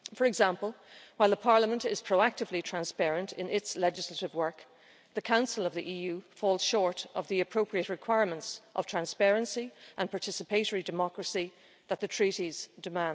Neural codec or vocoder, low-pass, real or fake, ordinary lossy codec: none; none; real; none